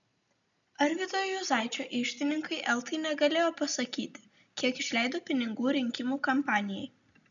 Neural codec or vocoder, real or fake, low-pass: none; real; 7.2 kHz